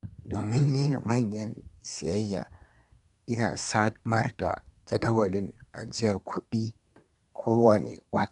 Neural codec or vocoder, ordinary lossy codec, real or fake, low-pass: codec, 24 kHz, 1 kbps, SNAC; none; fake; 10.8 kHz